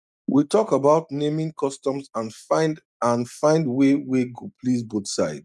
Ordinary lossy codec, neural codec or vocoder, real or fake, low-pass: none; none; real; none